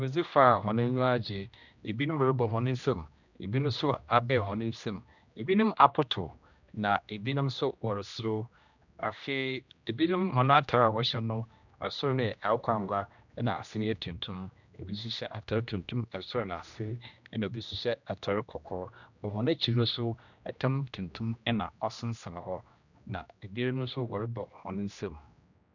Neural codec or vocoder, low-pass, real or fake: codec, 16 kHz, 1 kbps, X-Codec, HuBERT features, trained on general audio; 7.2 kHz; fake